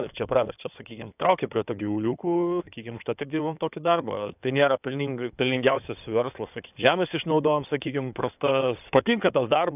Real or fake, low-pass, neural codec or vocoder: fake; 3.6 kHz; codec, 16 kHz in and 24 kHz out, 2.2 kbps, FireRedTTS-2 codec